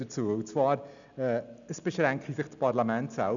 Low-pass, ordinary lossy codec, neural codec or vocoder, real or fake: 7.2 kHz; none; none; real